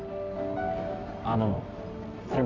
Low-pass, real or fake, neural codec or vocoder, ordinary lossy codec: 7.2 kHz; fake; codec, 24 kHz, 0.9 kbps, WavTokenizer, medium music audio release; Opus, 32 kbps